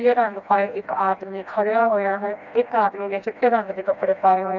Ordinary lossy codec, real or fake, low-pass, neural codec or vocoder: none; fake; 7.2 kHz; codec, 16 kHz, 1 kbps, FreqCodec, smaller model